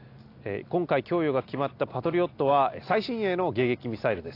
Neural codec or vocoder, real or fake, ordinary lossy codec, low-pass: none; real; AAC, 32 kbps; 5.4 kHz